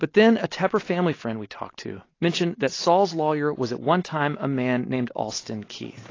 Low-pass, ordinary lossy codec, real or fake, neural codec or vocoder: 7.2 kHz; AAC, 32 kbps; real; none